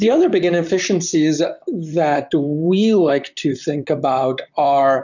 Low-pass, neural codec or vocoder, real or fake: 7.2 kHz; vocoder, 44.1 kHz, 128 mel bands, Pupu-Vocoder; fake